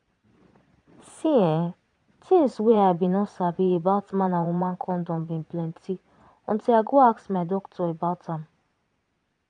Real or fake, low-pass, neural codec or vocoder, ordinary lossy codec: fake; 9.9 kHz; vocoder, 22.05 kHz, 80 mel bands, Vocos; none